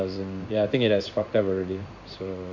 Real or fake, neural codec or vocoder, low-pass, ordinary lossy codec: fake; codec, 16 kHz in and 24 kHz out, 1 kbps, XY-Tokenizer; 7.2 kHz; none